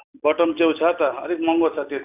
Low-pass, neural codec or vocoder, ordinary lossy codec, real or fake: 3.6 kHz; none; none; real